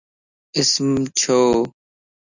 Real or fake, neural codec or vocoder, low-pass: real; none; 7.2 kHz